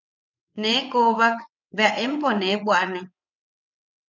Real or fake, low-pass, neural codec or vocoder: fake; 7.2 kHz; vocoder, 22.05 kHz, 80 mel bands, WaveNeXt